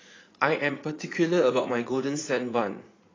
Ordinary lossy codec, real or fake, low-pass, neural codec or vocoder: AAC, 32 kbps; fake; 7.2 kHz; vocoder, 44.1 kHz, 80 mel bands, Vocos